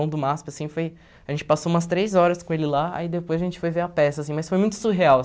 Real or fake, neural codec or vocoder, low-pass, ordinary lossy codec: real; none; none; none